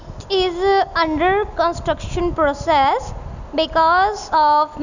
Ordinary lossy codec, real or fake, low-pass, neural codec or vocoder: none; real; 7.2 kHz; none